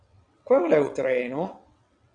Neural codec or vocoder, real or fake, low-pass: vocoder, 22.05 kHz, 80 mel bands, WaveNeXt; fake; 9.9 kHz